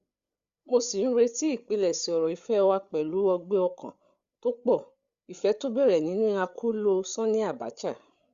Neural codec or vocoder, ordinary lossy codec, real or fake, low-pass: codec, 16 kHz, 8 kbps, FreqCodec, larger model; Opus, 64 kbps; fake; 7.2 kHz